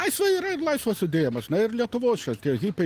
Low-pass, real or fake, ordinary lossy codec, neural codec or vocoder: 14.4 kHz; real; Opus, 24 kbps; none